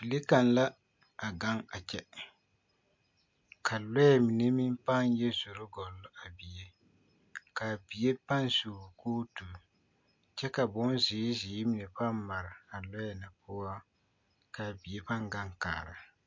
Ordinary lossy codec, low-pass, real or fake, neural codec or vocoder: MP3, 48 kbps; 7.2 kHz; real; none